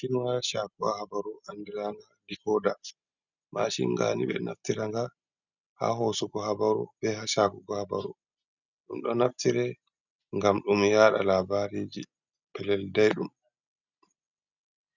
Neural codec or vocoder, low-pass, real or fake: none; 7.2 kHz; real